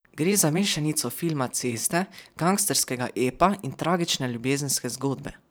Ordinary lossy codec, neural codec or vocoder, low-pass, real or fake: none; vocoder, 44.1 kHz, 128 mel bands every 512 samples, BigVGAN v2; none; fake